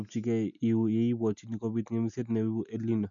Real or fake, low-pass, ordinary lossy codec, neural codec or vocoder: real; 7.2 kHz; none; none